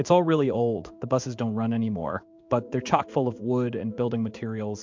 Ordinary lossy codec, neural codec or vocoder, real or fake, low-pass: MP3, 64 kbps; codec, 16 kHz in and 24 kHz out, 1 kbps, XY-Tokenizer; fake; 7.2 kHz